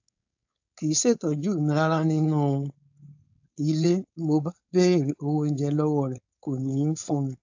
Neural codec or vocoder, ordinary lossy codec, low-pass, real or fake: codec, 16 kHz, 4.8 kbps, FACodec; AAC, 48 kbps; 7.2 kHz; fake